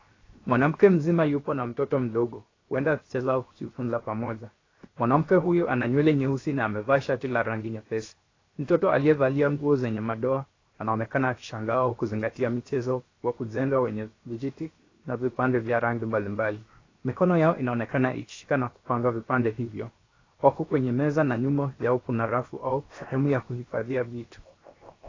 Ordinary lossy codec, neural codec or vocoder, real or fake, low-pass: AAC, 32 kbps; codec, 16 kHz, 0.7 kbps, FocalCodec; fake; 7.2 kHz